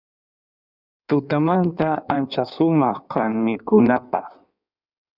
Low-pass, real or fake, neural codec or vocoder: 5.4 kHz; fake; codec, 16 kHz in and 24 kHz out, 1.1 kbps, FireRedTTS-2 codec